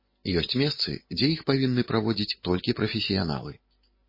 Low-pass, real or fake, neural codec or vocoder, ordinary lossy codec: 5.4 kHz; real; none; MP3, 24 kbps